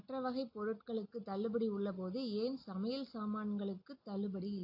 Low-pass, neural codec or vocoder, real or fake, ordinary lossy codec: 5.4 kHz; none; real; AAC, 48 kbps